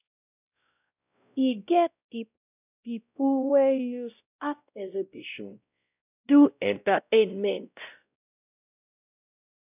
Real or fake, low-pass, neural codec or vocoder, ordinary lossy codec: fake; 3.6 kHz; codec, 16 kHz, 0.5 kbps, X-Codec, WavLM features, trained on Multilingual LibriSpeech; none